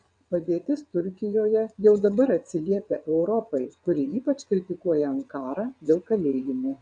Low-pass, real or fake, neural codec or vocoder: 9.9 kHz; fake; vocoder, 22.05 kHz, 80 mel bands, WaveNeXt